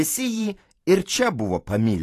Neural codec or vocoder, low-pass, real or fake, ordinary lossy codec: vocoder, 44.1 kHz, 128 mel bands every 512 samples, BigVGAN v2; 14.4 kHz; fake; AAC, 48 kbps